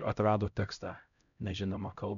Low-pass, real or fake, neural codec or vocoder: 7.2 kHz; fake; codec, 16 kHz, 0.5 kbps, X-Codec, HuBERT features, trained on LibriSpeech